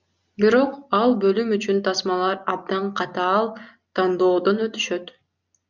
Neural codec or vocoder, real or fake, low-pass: none; real; 7.2 kHz